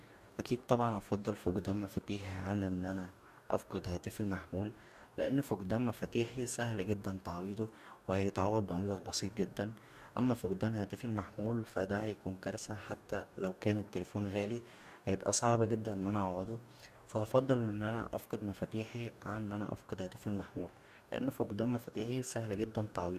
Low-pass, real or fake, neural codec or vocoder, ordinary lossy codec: 14.4 kHz; fake; codec, 44.1 kHz, 2.6 kbps, DAC; none